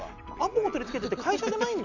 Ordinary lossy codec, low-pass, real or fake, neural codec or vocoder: none; 7.2 kHz; real; none